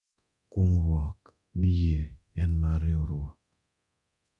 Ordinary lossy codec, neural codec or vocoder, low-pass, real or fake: none; codec, 24 kHz, 0.9 kbps, DualCodec; 10.8 kHz; fake